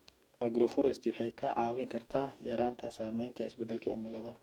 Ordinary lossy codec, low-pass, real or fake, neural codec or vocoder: none; 19.8 kHz; fake; codec, 44.1 kHz, 2.6 kbps, DAC